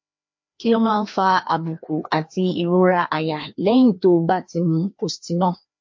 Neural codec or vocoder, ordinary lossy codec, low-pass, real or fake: codec, 16 kHz, 2 kbps, FreqCodec, larger model; MP3, 48 kbps; 7.2 kHz; fake